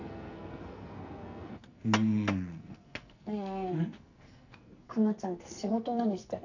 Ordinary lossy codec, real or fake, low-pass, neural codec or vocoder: none; fake; 7.2 kHz; codec, 44.1 kHz, 2.6 kbps, SNAC